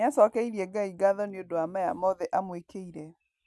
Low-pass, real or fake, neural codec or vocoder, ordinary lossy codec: none; real; none; none